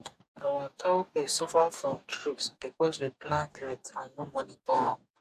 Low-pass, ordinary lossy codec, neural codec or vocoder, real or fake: 14.4 kHz; AAC, 96 kbps; codec, 44.1 kHz, 2.6 kbps, DAC; fake